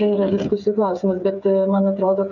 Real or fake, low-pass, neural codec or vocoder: fake; 7.2 kHz; codec, 16 kHz, 8 kbps, FreqCodec, smaller model